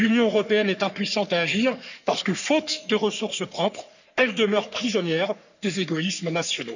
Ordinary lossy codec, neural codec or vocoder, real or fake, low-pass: none; codec, 44.1 kHz, 3.4 kbps, Pupu-Codec; fake; 7.2 kHz